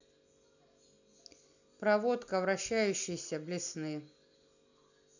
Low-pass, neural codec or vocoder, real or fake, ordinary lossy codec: 7.2 kHz; none; real; none